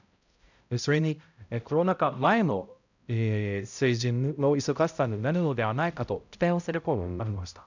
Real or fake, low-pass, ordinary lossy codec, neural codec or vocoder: fake; 7.2 kHz; none; codec, 16 kHz, 0.5 kbps, X-Codec, HuBERT features, trained on balanced general audio